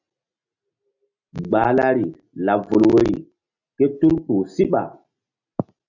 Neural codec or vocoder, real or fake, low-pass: none; real; 7.2 kHz